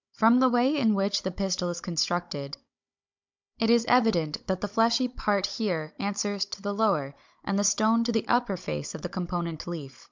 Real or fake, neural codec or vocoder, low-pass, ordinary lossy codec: fake; codec, 16 kHz, 16 kbps, FunCodec, trained on Chinese and English, 50 frames a second; 7.2 kHz; MP3, 64 kbps